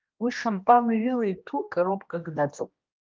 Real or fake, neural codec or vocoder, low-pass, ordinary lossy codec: fake; codec, 16 kHz, 1 kbps, X-Codec, HuBERT features, trained on general audio; 7.2 kHz; Opus, 32 kbps